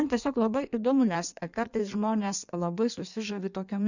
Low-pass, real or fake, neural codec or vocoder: 7.2 kHz; fake; codec, 16 kHz in and 24 kHz out, 1.1 kbps, FireRedTTS-2 codec